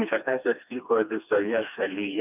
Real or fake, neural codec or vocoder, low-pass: fake; codec, 16 kHz, 2 kbps, FreqCodec, smaller model; 3.6 kHz